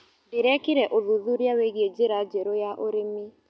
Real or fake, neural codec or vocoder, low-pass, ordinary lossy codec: real; none; none; none